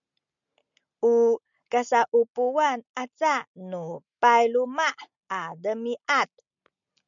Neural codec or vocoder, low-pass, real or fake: none; 7.2 kHz; real